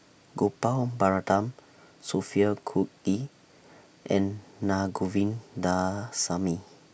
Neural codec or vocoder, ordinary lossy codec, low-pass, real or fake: none; none; none; real